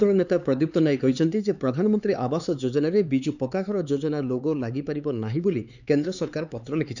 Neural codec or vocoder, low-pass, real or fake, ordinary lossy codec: codec, 16 kHz, 4 kbps, X-Codec, HuBERT features, trained on LibriSpeech; 7.2 kHz; fake; none